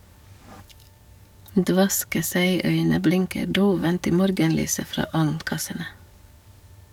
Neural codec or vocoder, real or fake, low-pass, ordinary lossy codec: codec, 44.1 kHz, 7.8 kbps, DAC; fake; 19.8 kHz; none